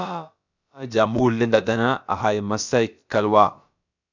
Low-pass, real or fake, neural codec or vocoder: 7.2 kHz; fake; codec, 16 kHz, about 1 kbps, DyCAST, with the encoder's durations